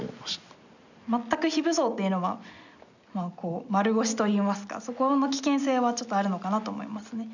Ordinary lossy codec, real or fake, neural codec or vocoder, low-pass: none; real; none; 7.2 kHz